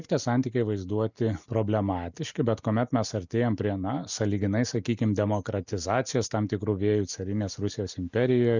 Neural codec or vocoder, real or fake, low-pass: none; real; 7.2 kHz